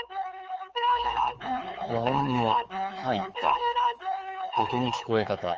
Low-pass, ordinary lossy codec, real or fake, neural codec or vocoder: 7.2 kHz; Opus, 24 kbps; fake; codec, 16 kHz, 4 kbps, X-Codec, HuBERT features, trained on LibriSpeech